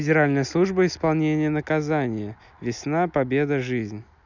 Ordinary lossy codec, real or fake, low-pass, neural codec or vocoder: none; real; 7.2 kHz; none